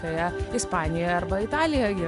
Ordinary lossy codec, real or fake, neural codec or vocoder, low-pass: AAC, 96 kbps; real; none; 10.8 kHz